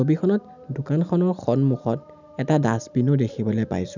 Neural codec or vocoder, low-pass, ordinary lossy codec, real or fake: none; 7.2 kHz; none; real